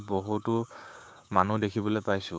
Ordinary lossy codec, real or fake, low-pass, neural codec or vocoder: none; fake; none; codec, 16 kHz, 6 kbps, DAC